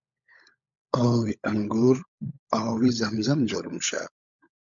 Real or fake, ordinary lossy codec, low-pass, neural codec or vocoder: fake; MP3, 64 kbps; 7.2 kHz; codec, 16 kHz, 16 kbps, FunCodec, trained on LibriTTS, 50 frames a second